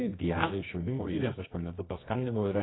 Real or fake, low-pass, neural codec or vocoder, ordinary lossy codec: fake; 7.2 kHz; codec, 24 kHz, 0.9 kbps, WavTokenizer, medium music audio release; AAC, 16 kbps